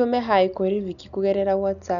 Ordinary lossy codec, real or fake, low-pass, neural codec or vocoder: none; real; 7.2 kHz; none